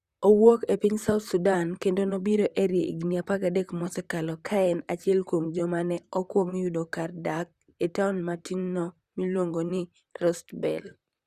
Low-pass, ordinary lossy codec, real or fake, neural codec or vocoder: 14.4 kHz; Opus, 64 kbps; fake; vocoder, 44.1 kHz, 128 mel bands, Pupu-Vocoder